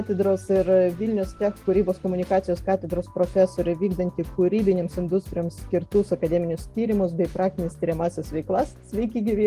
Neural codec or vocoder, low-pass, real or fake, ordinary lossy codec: none; 14.4 kHz; real; Opus, 24 kbps